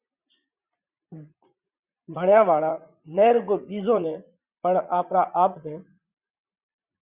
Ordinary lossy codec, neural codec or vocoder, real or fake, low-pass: Opus, 64 kbps; vocoder, 44.1 kHz, 80 mel bands, Vocos; fake; 3.6 kHz